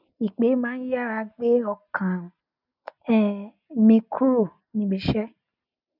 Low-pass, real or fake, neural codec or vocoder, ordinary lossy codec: 5.4 kHz; real; none; none